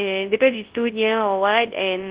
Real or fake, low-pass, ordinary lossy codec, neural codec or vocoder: fake; 3.6 kHz; Opus, 16 kbps; codec, 24 kHz, 0.9 kbps, WavTokenizer, large speech release